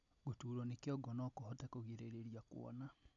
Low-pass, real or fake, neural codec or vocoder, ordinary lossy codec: 7.2 kHz; real; none; none